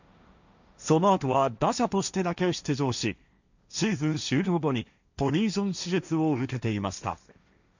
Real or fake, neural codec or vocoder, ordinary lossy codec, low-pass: fake; codec, 16 kHz, 1.1 kbps, Voila-Tokenizer; none; 7.2 kHz